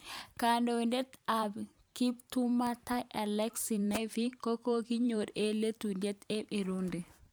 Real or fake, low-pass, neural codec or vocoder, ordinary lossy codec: fake; none; vocoder, 44.1 kHz, 128 mel bands every 512 samples, BigVGAN v2; none